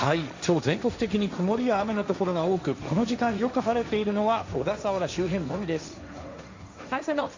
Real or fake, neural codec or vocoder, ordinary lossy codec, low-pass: fake; codec, 16 kHz, 1.1 kbps, Voila-Tokenizer; MP3, 64 kbps; 7.2 kHz